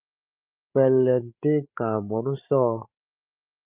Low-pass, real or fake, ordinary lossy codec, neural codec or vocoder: 3.6 kHz; fake; Opus, 24 kbps; codec, 16 kHz, 16 kbps, FreqCodec, larger model